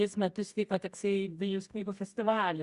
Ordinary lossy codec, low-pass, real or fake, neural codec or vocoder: Opus, 64 kbps; 10.8 kHz; fake; codec, 24 kHz, 0.9 kbps, WavTokenizer, medium music audio release